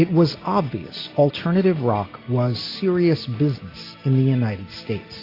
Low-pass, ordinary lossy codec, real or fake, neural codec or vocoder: 5.4 kHz; AAC, 24 kbps; real; none